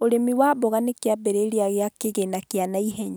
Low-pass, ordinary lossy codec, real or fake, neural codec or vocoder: none; none; real; none